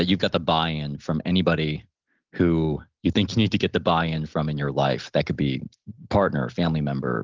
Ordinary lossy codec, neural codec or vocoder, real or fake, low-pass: Opus, 16 kbps; none; real; 7.2 kHz